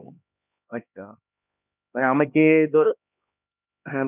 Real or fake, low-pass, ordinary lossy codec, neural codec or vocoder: fake; 3.6 kHz; none; codec, 16 kHz, 2 kbps, X-Codec, HuBERT features, trained on LibriSpeech